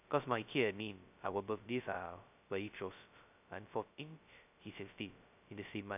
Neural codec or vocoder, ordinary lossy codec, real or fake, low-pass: codec, 16 kHz, 0.2 kbps, FocalCodec; none; fake; 3.6 kHz